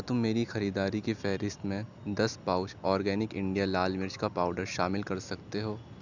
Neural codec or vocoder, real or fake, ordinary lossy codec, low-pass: none; real; none; 7.2 kHz